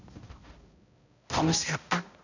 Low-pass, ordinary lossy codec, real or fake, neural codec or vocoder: 7.2 kHz; none; fake; codec, 16 kHz, 0.5 kbps, X-Codec, HuBERT features, trained on balanced general audio